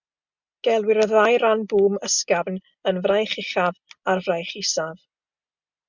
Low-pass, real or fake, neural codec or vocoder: 7.2 kHz; real; none